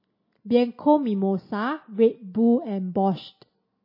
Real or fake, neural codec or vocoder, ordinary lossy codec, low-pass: real; none; MP3, 24 kbps; 5.4 kHz